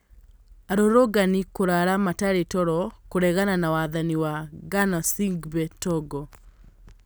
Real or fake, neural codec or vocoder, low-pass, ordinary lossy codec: real; none; none; none